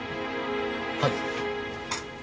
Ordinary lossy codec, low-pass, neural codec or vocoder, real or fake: none; none; none; real